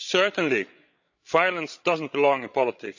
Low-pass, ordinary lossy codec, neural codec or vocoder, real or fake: 7.2 kHz; none; codec, 16 kHz, 8 kbps, FreqCodec, larger model; fake